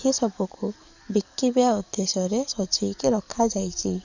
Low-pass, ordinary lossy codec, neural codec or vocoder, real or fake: 7.2 kHz; none; codec, 44.1 kHz, 7.8 kbps, DAC; fake